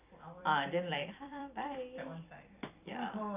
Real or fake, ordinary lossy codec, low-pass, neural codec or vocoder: fake; none; 3.6 kHz; vocoder, 44.1 kHz, 128 mel bands every 256 samples, BigVGAN v2